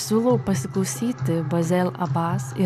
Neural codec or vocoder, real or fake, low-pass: none; real; 14.4 kHz